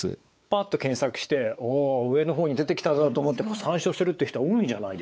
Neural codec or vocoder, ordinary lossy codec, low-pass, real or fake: codec, 16 kHz, 4 kbps, X-Codec, WavLM features, trained on Multilingual LibriSpeech; none; none; fake